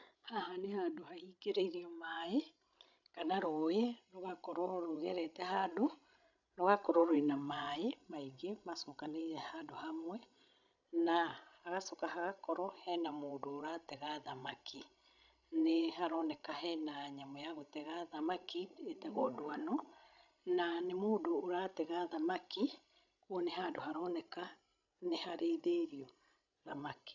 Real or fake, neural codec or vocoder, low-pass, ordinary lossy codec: fake; codec, 16 kHz, 16 kbps, FreqCodec, larger model; 7.2 kHz; none